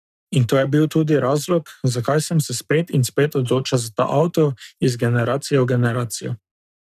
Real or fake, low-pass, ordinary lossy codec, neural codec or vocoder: fake; 14.4 kHz; none; codec, 44.1 kHz, 7.8 kbps, Pupu-Codec